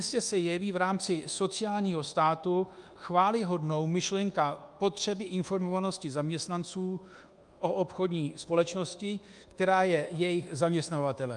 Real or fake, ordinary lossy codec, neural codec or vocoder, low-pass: fake; Opus, 32 kbps; codec, 24 kHz, 1.2 kbps, DualCodec; 10.8 kHz